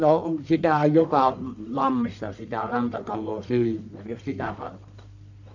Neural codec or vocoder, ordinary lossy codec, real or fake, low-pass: codec, 44.1 kHz, 1.7 kbps, Pupu-Codec; none; fake; 7.2 kHz